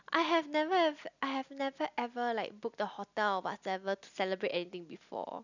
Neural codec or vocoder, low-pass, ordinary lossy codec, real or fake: none; 7.2 kHz; none; real